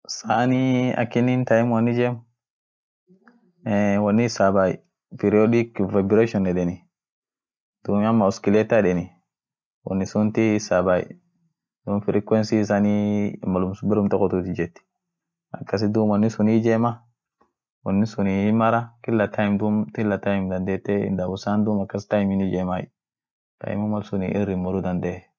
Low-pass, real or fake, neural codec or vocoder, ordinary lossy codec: none; real; none; none